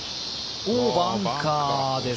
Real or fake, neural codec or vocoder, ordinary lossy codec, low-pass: real; none; none; none